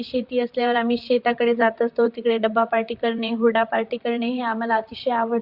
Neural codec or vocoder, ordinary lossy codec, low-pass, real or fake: vocoder, 44.1 kHz, 128 mel bands, Pupu-Vocoder; Opus, 64 kbps; 5.4 kHz; fake